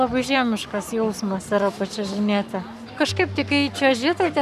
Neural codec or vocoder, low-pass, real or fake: codec, 44.1 kHz, 7.8 kbps, Pupu-Codec; 14.4 kHz; fake